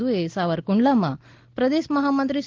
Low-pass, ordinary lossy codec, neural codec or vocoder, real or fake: 7.2 kHz; Opus, 16 kbps; none; real